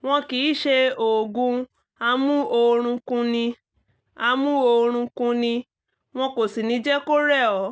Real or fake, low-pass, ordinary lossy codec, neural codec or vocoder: real; none; none; none